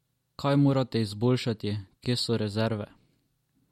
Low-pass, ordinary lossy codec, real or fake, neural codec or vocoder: 19.8 kHz; MP3, 64 kbps; real; none